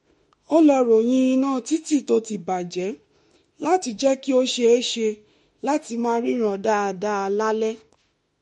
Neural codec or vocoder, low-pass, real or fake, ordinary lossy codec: autoencoder, 48 kHz, 32 numbers a frame, DAC-VAE, trained on Japanese speech; 19.8 kHz; fake; MP3, 48 kbps